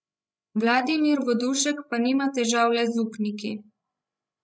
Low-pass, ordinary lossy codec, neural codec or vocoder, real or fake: none; none; codec, 16 kHz, 16 kbps, FreqCodec, larger model; fake